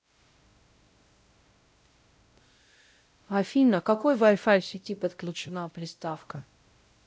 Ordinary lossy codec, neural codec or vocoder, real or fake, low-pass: none; codec, 16 kHz, 0.5 kbps, X-Codec, WavLM features, trained on Multilingual LibriSpeech; fake; none